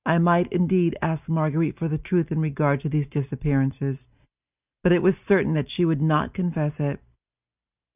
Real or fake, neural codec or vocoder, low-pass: real; none; 3.6 kHz